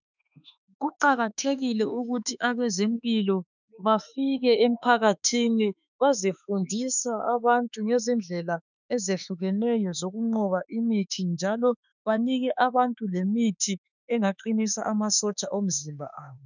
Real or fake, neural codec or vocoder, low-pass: fake; autoencoder, 48 kHz, 32 numbers a frame, DAC-VAE, trained on Japanese speech; 7.2 kHz